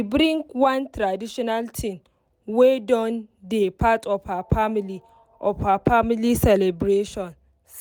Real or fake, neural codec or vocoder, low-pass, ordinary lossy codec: real; none; none; none